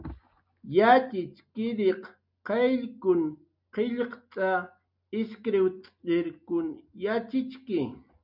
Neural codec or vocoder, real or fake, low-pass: none; real; 5.4 kHz